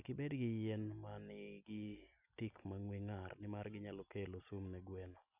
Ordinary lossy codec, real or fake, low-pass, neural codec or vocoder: none; real; 3.6 kHz; none